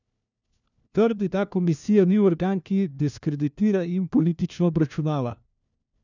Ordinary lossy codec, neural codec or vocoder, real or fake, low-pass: none; codec, 16 kHz, 1 kbps, FunCodec, trained on LibriTTS, 50 frames a second; fake; 7.2 kHz